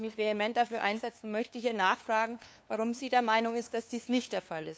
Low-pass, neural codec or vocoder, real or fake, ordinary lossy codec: none; codec, 16 kHz, 2 kbps, FunCodec, trained on LibriTTS, 25 frames a second; fake; none